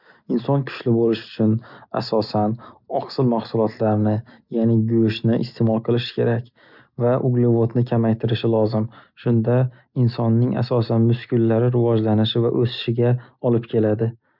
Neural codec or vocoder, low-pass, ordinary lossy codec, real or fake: none; 5.4 kHz; none; real